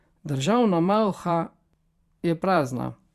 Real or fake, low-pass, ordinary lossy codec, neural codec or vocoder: fake; 14.4 kHz; Opus, 64 kbps; vocoder, 44.1 kHz, 128 mel bands every 512 samples, BigVGAN v2